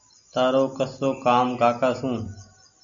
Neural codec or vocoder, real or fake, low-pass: none; real; 7.2 kHz